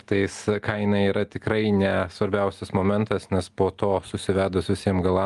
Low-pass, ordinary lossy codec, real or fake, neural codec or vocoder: 10.8 kHz; Opus, 32 kbps; real; none